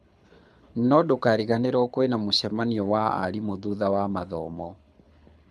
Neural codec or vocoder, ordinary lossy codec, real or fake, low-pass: codec, 24 kHz, 6 kbps, HILCodec; none; fake; none